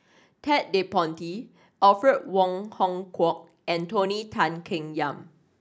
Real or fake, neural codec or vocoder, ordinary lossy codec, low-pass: real; none; none; none